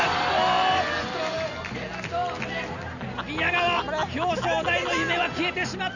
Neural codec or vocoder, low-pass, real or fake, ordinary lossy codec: none; 7.2 kHz; real; none